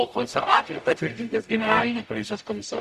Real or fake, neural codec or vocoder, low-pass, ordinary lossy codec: fake; codec, 44.1 kHz, 0.9 kbps, DAC; 14.4 kHz; Opus, 64 kbps